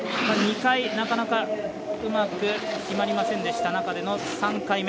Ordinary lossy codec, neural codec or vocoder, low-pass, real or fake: none; none; none; real